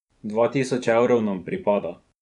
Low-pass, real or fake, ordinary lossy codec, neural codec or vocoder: 10.8 kHz; fake; none; vocoder, 24 kHz, 100 mel bands, Vocos